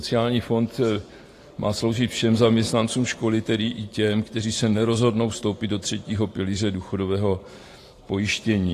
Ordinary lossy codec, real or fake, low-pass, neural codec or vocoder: AAC, 48 kbps; fake; 14.4 kHz; vocoder, 44.1 kHz, 128 mel bands every 512 samples, BigVGAN v2